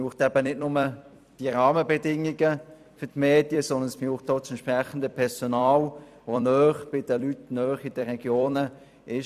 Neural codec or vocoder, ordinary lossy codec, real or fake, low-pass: vocoder, 44.1 kHz, 128 mel bands every 256 samples, BigVGAN v2; none; fake; 14.4 kHz